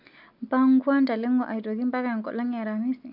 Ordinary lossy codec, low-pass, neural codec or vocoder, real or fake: none; 5.4 kHz; none; real